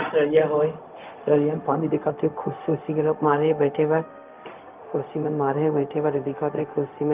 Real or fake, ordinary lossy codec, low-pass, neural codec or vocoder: fake; Opus, 24 kbps; 3.6 kHz; codec, 16 kHz, 0.4 kbps, LongCat-Audio-Codec